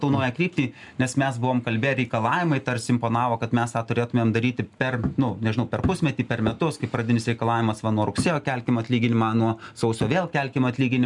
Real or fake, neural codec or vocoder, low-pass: real; none; 10.8 kHz